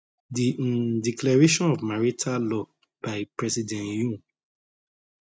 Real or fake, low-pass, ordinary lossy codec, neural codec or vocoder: real; none; none; none